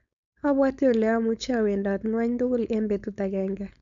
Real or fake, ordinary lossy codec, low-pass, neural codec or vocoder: fake; none; 7.2 kHz; codec, 16 kHz, 4.8 kbps, FACodec